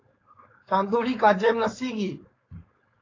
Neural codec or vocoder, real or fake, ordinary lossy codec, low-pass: codec, 16 kHz, 4.8 kbps, FACodec; fake; AAC, 32 kbps; 7.2 kHz